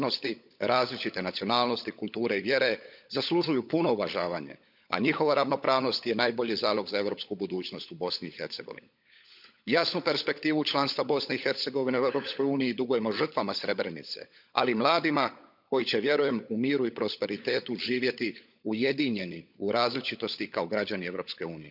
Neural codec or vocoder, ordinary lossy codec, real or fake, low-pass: codec, 16 kHz, 16 kbps, FunCodec, trained on LibriTTS, 50 frames a second; none; fake; 5.4 kHz